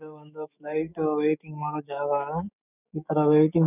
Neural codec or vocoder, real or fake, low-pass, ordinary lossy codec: autoencoder, 48 kHz, 128 numbers a frame, DAC-VAE, trained on Japanese speech; fake; 3.6 kHz; none